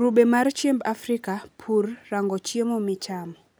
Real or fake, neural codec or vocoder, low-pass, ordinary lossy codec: real; none; none; none